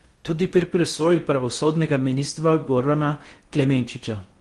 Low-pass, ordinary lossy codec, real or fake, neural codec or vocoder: 10.8 kHz; Opus, 24 kbps; fake; codec, 16 kHz in and 24 kHz out, 0.6 kbps, FocalCodec, streaming, 4096 codes